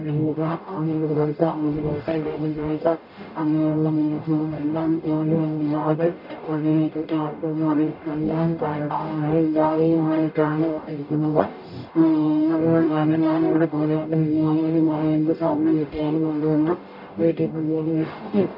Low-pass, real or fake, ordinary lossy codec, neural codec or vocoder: 5.4 kHz; fake; none; codec, 44.1 kHz, 0.9 kbps, DAC